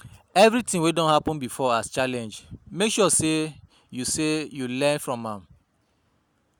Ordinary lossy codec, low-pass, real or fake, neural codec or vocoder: none; none; real; none